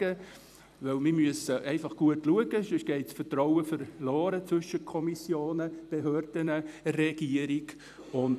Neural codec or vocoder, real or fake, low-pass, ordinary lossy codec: vocoder, 44.1 kHz, 128 mel bands every 256 samples, BigVGAN v2; fake; 14.4 kHz; none